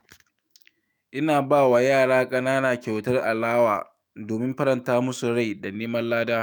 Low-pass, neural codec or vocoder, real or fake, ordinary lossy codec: none; autoencoder, 48 kHz, 128 numbers a frame, DAC-VAE, trained on Japanese speech; fake; none